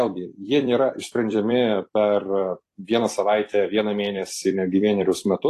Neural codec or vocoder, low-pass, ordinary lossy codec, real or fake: vocoder, 44.1 kHz, 128 mel bands every 256 samples, BigVGAN v2; 14.4 kHz; AAC, 48 kbps; fake